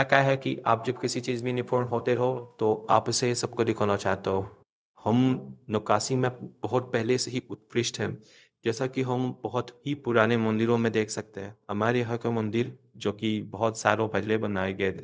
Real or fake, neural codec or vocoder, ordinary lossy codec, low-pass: fake; codec, 16 kHz, 0.4 kbps, LongCat-Audio-Codec; none; none